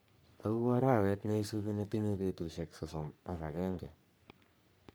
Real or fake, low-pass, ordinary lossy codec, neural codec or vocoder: fake; none; none; codec, 44.1 kHz, 3.4 kbps, Pupu-Codec